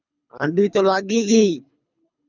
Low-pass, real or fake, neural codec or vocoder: 7.2 kHz; fake; codec, 24 kHz, 3 kbps, HILCodec